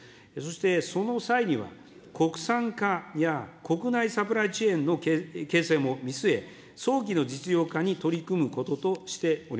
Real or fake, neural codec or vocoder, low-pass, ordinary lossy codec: real; none; none; none